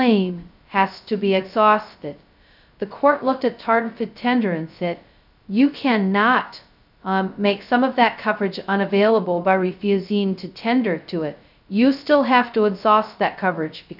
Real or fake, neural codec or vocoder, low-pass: fake; codec, 16 kHz, 0.2 kbps, FocalCodec; 5.4 kHz